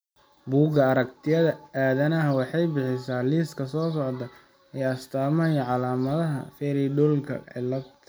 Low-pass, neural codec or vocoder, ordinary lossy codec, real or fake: none; none; none; real